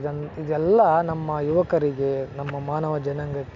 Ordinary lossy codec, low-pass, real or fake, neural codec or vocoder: none; 7.2 kHz; real; none